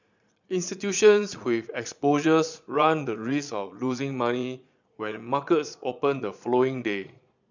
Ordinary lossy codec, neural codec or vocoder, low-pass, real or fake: none; vocoder, 22.05 kHz, 80 mel bands, Vocos; 7.2 kHz; fake